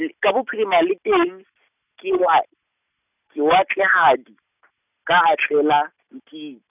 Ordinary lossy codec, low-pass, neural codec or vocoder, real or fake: none; 3.6 kHz; none; real